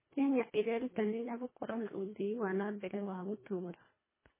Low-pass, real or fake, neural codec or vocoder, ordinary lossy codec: 3.6 kHz; fake; codec, 24 kHz, 1.5 kbps, HILCodec; MP3, 16 kbps